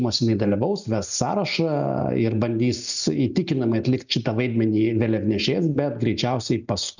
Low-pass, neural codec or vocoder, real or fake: 7.2 kHz; none; real